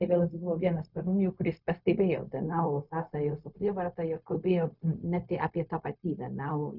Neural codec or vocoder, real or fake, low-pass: codec, 16 kHz, 0.4 kbps, LongCat-Audio-Codec; fake; 5.4 kHz